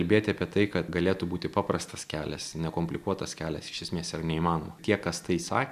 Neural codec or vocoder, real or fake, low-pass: none; real; 14.4 kHz